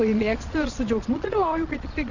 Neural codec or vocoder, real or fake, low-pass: vocoder, 22.05 kHz, 80 mel bands, Vocos; fake; 7.2 kHz